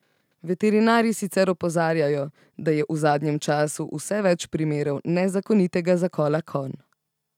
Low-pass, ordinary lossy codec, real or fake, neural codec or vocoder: 19.8 kHz; none; real; none